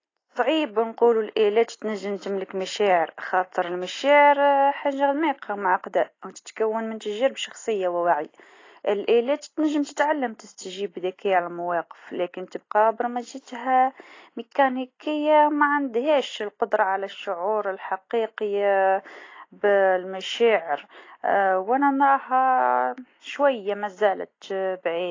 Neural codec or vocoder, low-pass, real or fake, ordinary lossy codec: none; 7.2 kHz; real; AAC, 32 kbps